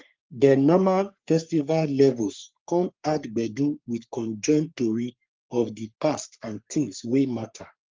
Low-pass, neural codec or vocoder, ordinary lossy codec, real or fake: 7.2 kHz; codec, 44.1 kHz, 3.4 kbps, Pupu-Codec; Opus, 32 kbps; fake